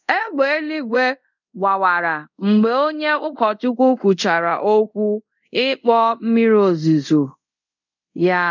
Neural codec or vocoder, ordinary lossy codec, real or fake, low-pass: codec, 24 kHz, 0.5 kbps, DualCodec; none; fake; 7.2 kHz